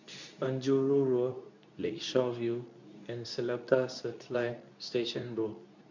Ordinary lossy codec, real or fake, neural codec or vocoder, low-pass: none; fake; codec, 24 kHz, 0.9 kbps, WavTokenizer, medium speech release version 2; 7.2 kHz